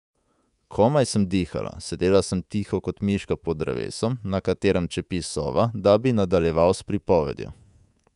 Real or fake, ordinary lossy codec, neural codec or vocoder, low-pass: fake; none; codec, 24 kHz, 3.1 kbps, DualCodec; 10.8 kHz